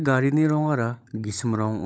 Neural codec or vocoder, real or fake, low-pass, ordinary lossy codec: codec, 16 kHz, 16 kbps, FunCodec, trained on LibriTTS, 50 frames a second; fake; none; none